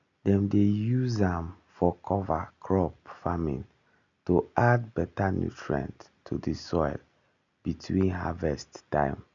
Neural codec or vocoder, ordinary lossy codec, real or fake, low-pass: none; none; real; 7.2 kHz